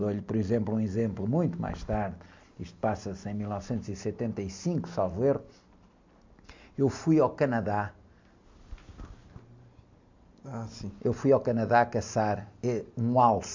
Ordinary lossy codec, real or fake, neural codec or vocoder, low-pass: MP3, 64 kbps; real; none; 7.2 kHz